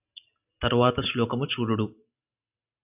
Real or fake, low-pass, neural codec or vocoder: real; 3.6 kHz; none